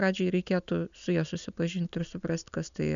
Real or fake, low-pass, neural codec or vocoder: real; 7.2 kHz; none